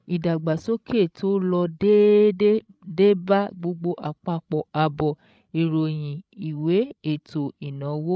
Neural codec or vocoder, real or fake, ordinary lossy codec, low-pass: codec, 16 kHz, 16 kbps, FreqCodec, larger model; fake; none; none